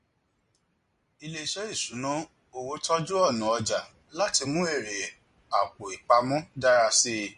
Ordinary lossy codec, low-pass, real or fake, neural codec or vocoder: MP3, 48 kbps; 14.4 kHz; real; none